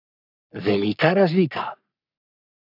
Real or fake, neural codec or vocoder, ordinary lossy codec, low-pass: fake; codec, 44.1 kHz, 3.4 kbps, Pupu-Codec; AAC, 48 kbps; 5.4 kHz